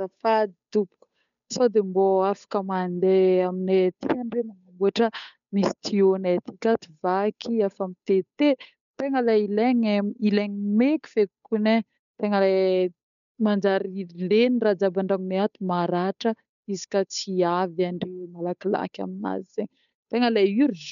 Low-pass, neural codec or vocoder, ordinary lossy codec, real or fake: 7.2 kHz; codec, 16 kHz, 8 kbps, FunCodec, trained on Chinese and English, 25 frames a second; none; fake